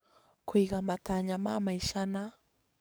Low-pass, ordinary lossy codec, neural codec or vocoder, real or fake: none; none; codec, 44.1 kHz, 7.8 kbps, DAC; fake